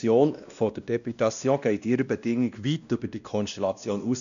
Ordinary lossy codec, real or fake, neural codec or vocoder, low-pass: none; fake; codec, 16 kHz, 1 kbps, X-Codec, WavLM features, trained on Multilingual LibriSpeech; 7.2 kHz